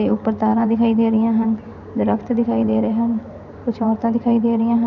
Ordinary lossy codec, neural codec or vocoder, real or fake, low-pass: none; vocoder, 44.1 kHz, 128 mel bands every 512 samples, BigVGAN v2; fake; 7.2 kHz